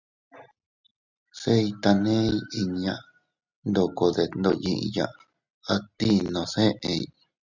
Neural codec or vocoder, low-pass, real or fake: none; 7.2 kHz; real